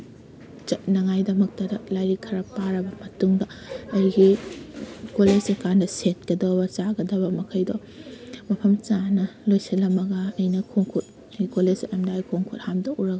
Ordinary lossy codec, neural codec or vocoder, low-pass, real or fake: none; none; none; real